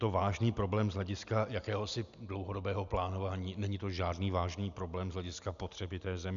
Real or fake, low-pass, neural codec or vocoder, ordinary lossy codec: real; 7.2 kHz; none; MP3, 96 kbps